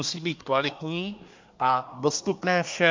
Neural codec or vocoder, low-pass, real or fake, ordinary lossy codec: codec, 24 kHz, 1 kbps, SNAC; 7.2 kHz; fake; MP3, 64 kbps